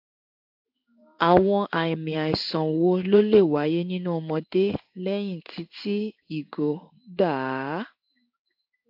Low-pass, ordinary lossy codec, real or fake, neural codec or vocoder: 5.4 kHz; AAC, 48 kbps; fake; codec, 16 kHz in and 24 kHz out, 1 kbps, XY-Tokenizer